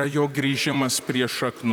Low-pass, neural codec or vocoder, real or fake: 19.8 kHz; vocoder, 44.1 kHz, 128 mel bands, Pupu-Vocoder; fake